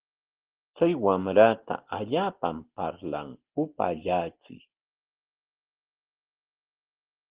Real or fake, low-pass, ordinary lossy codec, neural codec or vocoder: real; 3.6 kHz; Opus, 16 kbps; none